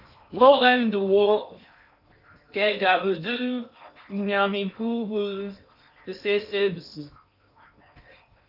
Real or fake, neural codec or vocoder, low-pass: fake; codec, 16 kHz in and 24 kHz out, 0.8 kbps, FocalCodec, streaming, 65536 codes; 5.4 kHz